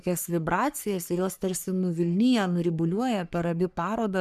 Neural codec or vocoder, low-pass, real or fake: codec, 44.1 kHz, 3.4 kbps, Pupu-Codec; 14.4 kHz; fake